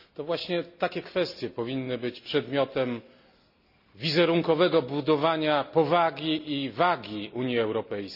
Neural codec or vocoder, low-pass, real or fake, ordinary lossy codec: none; 5.4 kHz; real; none